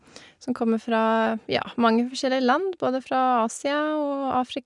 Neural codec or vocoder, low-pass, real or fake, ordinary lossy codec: none; 10.8 kHz; real; none